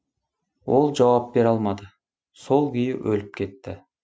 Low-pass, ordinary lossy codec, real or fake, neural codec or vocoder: none; none; real; none